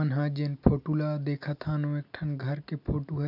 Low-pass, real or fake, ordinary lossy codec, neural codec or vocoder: 5.4 kHz; real; none; none